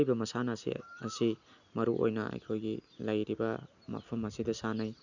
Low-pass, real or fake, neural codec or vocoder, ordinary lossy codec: 7.2 kHz; real; none; none